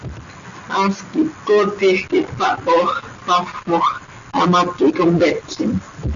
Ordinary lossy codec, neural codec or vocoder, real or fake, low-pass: MP3, 64 kbps; none; real; 7.2 kHz